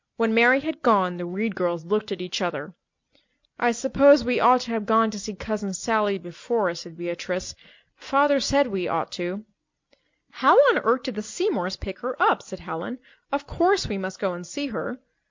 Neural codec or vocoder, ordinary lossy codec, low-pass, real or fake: none; MP3, 48 kbps; 7.2 kHz; real